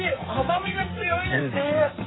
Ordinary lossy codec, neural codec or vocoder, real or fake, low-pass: AAC, 16 kbps; codec, 44.1 kHz, 2.6 kbps, SNAC; fake; 7.2 kHz